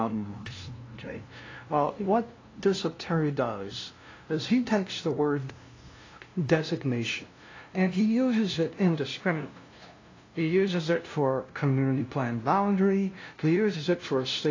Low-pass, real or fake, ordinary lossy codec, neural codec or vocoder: 7.2 kHz; fake; AAC, 32 kbps; codec, 16 kHz, 0.5 kbps, FunCodec, trained on LibriTTS, 25 frames a second